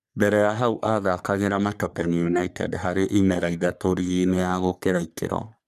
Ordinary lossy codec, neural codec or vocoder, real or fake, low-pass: none; codec, 44.1 kHz, 3.4 kbps, Pupu-Codec; fake; 14.4 kHz